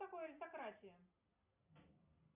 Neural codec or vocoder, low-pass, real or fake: none; 3.6 kHz; real